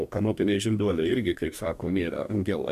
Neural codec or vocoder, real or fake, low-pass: codec, 44.1 kHz, 2.6 kbps, DAC; fake; 14.4 kHz